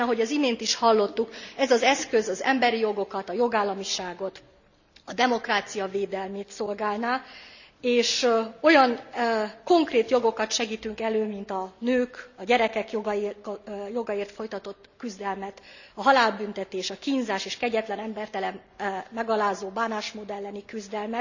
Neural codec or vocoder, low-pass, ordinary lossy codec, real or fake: none; 7.2 kHz; none; real